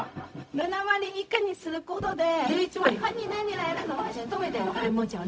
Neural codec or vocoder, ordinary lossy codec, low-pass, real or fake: codec, 16 kHz, 0.4 kbps, LongCat-Audio-Codec; none; none; fake